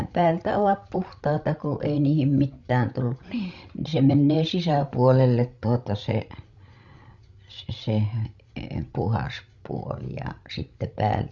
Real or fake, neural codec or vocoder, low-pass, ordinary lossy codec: fake; codec, 16 kHz, 16 kbps, FreqCodec, larger model; 7.2 kHz; Opus, 64 kbps